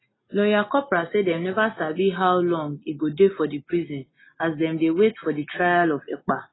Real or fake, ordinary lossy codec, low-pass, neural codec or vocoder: real; AAC, 16 kbps; 7.2 kHz; none